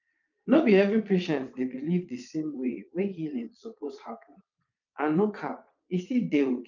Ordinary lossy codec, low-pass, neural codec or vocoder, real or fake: none; 7.2 kHz; vocoder, 22.05 kHz, 80 mel bands, Vocos; fake